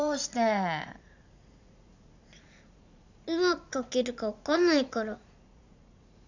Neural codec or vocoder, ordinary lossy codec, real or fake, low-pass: vocoder, 44.1 kHz, 80 mel bands, Vocos; none; fake; 7.2 kHz